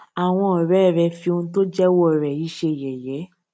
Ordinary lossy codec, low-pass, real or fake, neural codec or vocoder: none; none; real; none